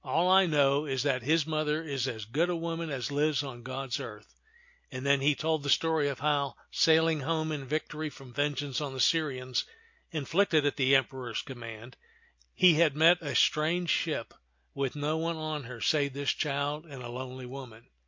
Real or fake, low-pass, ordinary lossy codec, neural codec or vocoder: real; 7.2 kHz; MP3, 48 kbps; none